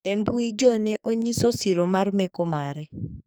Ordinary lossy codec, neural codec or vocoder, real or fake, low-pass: none; codec, 44.1 kHz, 2.6 kbps, SNAC; fake; none